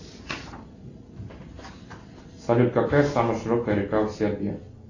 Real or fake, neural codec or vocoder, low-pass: real; none; 7.2 kHz